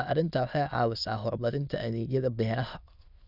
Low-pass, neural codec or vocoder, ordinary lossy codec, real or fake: 5.4 kHz; autoencoder, 22.05 kHz, a latent of 192 numbers a frame, VITS, trained on many speakers; none; fake